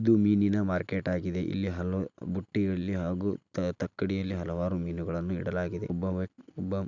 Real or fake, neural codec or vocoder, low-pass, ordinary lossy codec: real; none; 7.2 kHz; none